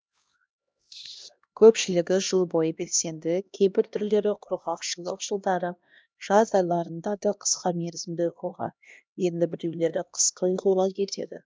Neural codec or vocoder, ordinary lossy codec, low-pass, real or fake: codec, 16 kHz, 1 kbps, X-Codec, HuBERT features, trained on LibriSpeech; none; none; fake